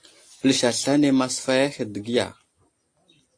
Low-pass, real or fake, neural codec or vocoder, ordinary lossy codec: 9.9 kHz; real; none; AAC, 48 kbps